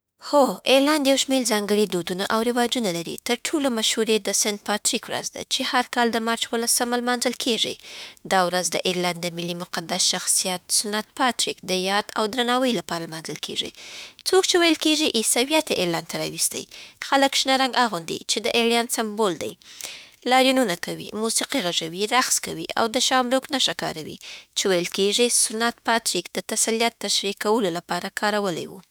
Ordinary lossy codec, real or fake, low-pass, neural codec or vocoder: none; fake; none; autoencoder, 48 kHz, 32 numbers a frame, DAC-VAE, trained on Japanese speech